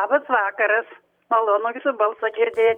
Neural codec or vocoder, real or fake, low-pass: vocoder, 44.1 kHz, 128 mel bands every 256 samples, BigVGAN v2; fake; 19.8 kHz